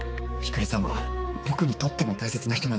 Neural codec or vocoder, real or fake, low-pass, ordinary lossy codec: codec, 16 kHz, 4 kbps, X-Codec, HuBERT features, trained on balanced general audio; fake; none; none